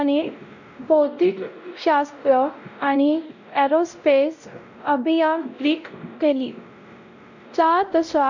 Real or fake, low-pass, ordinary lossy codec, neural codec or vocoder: fake; 7.2 kHz; none; codec, 16 kHz, 0.5 kbps, X-Codec, WavLM features, trained on Multilingual LibriSpeech